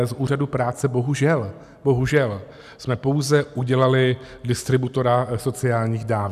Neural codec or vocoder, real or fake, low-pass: none; real; 14.4 kHz